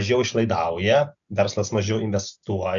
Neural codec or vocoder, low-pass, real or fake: none; 7.2 kHz; real